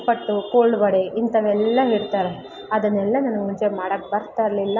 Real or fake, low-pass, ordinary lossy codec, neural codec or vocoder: real; 7.2 kHz; none; none